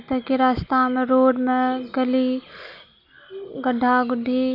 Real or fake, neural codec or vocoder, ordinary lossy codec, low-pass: real; none; none; 5.4 kHz